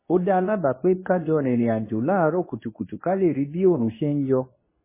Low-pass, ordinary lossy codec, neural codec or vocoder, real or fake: 3.6 kHz; MP3, 16 kbps; codec, 24 kHz, 0.9 kbps, WavTokenizer, medium speech release version 2; fake